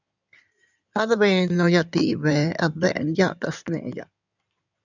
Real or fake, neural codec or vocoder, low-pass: fake; codec, 16 kHz in and 24 kHz out, 2.2 kbps, FireRedTTS-2 codec; 7.2 kHz